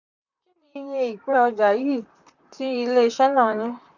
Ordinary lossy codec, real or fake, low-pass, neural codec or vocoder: none; fake; 7.2 kHz; vocoder, 44.1 kHz, 128 mel bands, Pupu-Vocoder